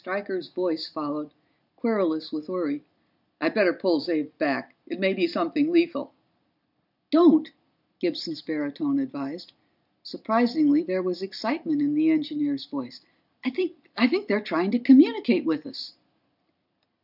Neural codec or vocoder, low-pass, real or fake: none; 5.4 kHz; real